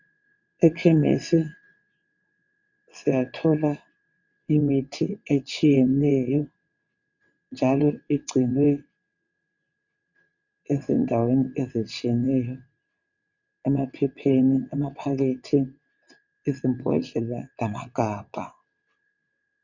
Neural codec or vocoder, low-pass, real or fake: vocoder, 22.05 kHz, 80 mel bands, WaveNeXt; 7.2 kHz; fake